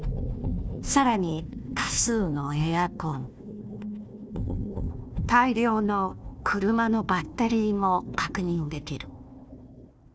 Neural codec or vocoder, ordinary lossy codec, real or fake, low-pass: codec, 16 kHz, 1 kbps, FunCodec, trained on Chinese and English, 50 frames a second; none; fake; none